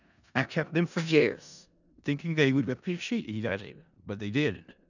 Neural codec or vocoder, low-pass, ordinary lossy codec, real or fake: codec, 16 kHz in and 24 kHz out, 0.4 kbps, LongCat-Audio-Codec, four codebook decoder; 7.2 kHz; none; fake